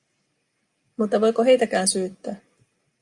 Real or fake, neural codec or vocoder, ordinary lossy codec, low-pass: real; none; Opus, 64 kbps; 10.8 kHz